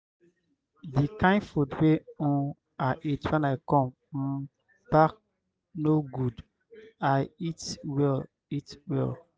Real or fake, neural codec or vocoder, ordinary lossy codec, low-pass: real; none; none; none